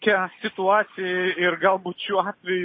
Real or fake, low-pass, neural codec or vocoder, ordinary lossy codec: real; 7.2 kHz; none; MP3, 24 kbps